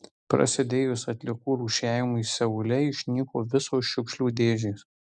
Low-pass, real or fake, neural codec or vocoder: 10.8 kHz; real; none